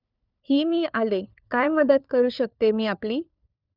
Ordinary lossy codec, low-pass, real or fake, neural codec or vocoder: none; 5.4 kHz; fake; codec, 16 kHz, 4 kbps, FunCodec, trained on LibriTTS, 50 frames a second